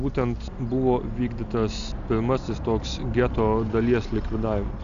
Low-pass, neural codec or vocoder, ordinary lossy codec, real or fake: 7.2 kHz; none; AAC, 96 kbps; real